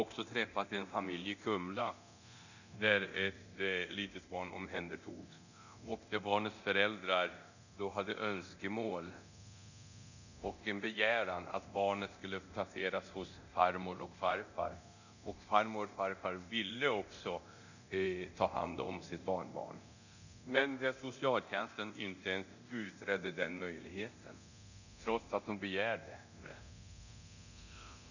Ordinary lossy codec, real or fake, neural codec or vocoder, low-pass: none; fake; codec, 24 kHz, 0.9 kbps, DualCodec; 7.2 kHz